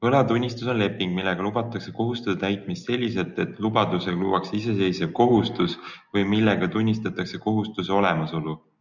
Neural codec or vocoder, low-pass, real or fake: none; 7.2 kHz; real